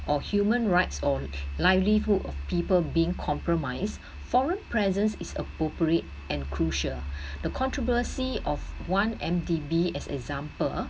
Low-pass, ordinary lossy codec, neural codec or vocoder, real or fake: none; none; none; real